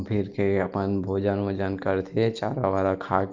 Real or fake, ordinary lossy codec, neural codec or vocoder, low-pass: real; Opus, 24 kbps; none; 7.2 kHz